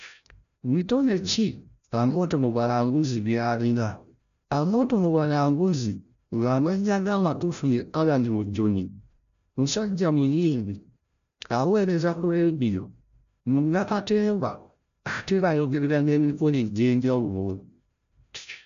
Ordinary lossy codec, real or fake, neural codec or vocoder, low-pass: none; fake; codec, 16 kHz, 0.5 kbps, FreqCodec, larger model; 7.2 kHz